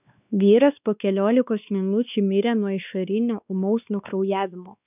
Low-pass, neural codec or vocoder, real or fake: 3.6 kHz; codec, 16 kHz, 2 kbps, X-Codec, WavLM features, trained on Multilingual LibriSpeech; fake